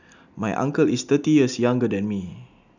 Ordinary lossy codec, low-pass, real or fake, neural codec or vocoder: none; 7.2 kHz; real; none